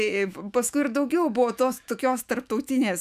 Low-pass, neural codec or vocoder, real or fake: 14.4 kHz; autoencoder, 48 kHz, 128 numbers a frame, DAC-VAE, trained on Japanese speech; fake